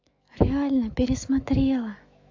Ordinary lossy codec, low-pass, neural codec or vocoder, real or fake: AAC, 48 kbps; 7.2 kHz; autoencoder, 48 kHz, 128 numbers a frame, DAC-VAE, trained on Japanese speech; fake